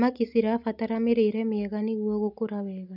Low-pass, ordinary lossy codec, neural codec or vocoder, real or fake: 5.4 kHz; none; none; real